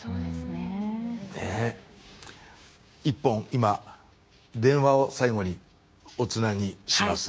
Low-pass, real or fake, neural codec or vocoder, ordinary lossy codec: none; fake; codec, 16 kHz, 6 kbps, DAC; none